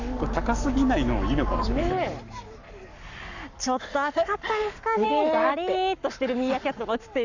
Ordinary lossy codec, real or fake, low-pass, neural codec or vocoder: none; fake; 7.2 kHz; codec, 44.1 kHz, 7.8 kbps, Pupu-Codec